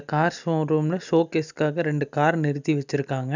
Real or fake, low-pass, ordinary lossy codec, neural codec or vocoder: real; 7.2 kHz; none; none